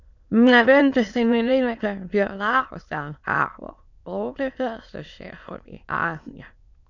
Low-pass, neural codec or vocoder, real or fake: 7.2 kHz; autoencoder, 22.05 kHz, a latent of 192 numbers a frame, VITS, trained on many speakers; fake